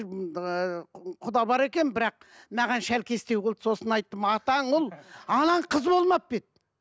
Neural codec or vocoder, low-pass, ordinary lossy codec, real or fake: none; none; none; real